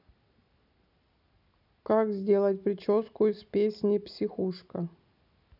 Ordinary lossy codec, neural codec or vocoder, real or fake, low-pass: none; none; real; 5.4 kHz